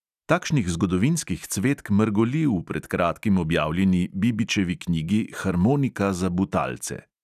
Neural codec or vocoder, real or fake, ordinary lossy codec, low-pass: none; real; none; 14.4 kHz